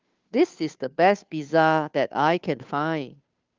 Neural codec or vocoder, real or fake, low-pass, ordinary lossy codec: codec, 16 kHz, 2 kbps, FunCodec, trained on Chinese and English, 25 frames a second; fake; 7.2 kHz; Opus, 24 kbps